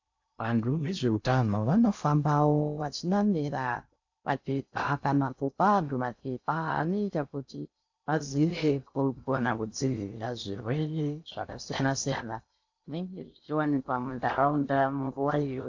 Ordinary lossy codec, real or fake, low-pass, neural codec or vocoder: AAC, 48 kbps; fake; 7.2 kHz; codec, 16 kHz in and 24 kHz out, 0.6 kbps, FocalCodec, streaming, 2048 codes